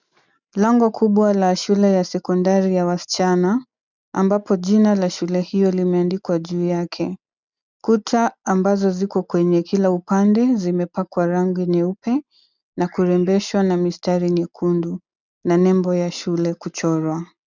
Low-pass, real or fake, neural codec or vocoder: 7.2 kHz; real; none